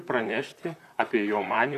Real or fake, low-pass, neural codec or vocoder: fake; 14.4 kHz; vocoder, 44.1 kHz, 128 mel bands, Pupu-Vocoder